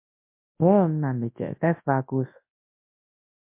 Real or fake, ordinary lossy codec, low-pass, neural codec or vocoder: fake; MP3, 24 kbps; 3.6 kHz; codec, 24 kHz, 0.9 kbps, WavTokenizer, large speech release